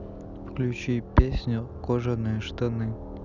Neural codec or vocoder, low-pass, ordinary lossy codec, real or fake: none; 7.2 kHz; none; real